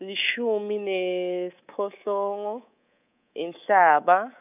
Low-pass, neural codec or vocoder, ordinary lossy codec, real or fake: 3.6 kHz; codec, 24 kHz, 3.1 kbps, DualCodec; none; fake